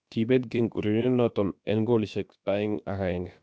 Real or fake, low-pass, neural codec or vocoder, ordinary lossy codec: fake; none; codec, 16 kHz, about 1 kbps, DyCAST, with the encoder's durations; none